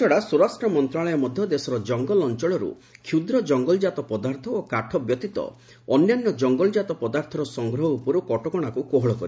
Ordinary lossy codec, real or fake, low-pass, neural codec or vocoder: none; real; none; none